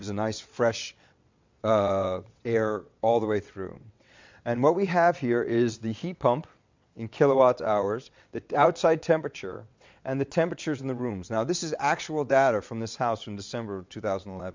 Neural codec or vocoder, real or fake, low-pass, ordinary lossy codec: vocoder, 22.05 kHz, 80 mel bands, WaveNeXt; fake; 7.2 kHz; MP3, 64 kbps